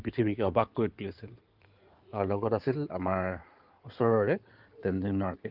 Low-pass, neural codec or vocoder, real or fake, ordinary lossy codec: 5.4 kHz; codec, 16 kHz in and 24 kHz out, 2.2 kbps, FireRedTTS-2 codec; fake; Opus, 32 kbps